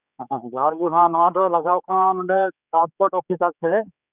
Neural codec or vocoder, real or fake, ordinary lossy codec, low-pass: codec, 16 kHz, 4 kbps, X-Codec, HuBERT features, trained on general audio; fake; none; 3.6 kHz